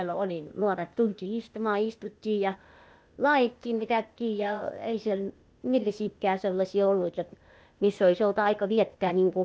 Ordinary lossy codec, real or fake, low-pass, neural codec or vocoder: none; fake; none; codec, 16 kHz, 0.8 kbps, ZipCodec